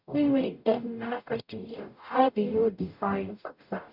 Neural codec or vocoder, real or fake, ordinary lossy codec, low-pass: codec, 44.1 kHz, 0.9 kbps, DAC; fake; none; 5.4 kHz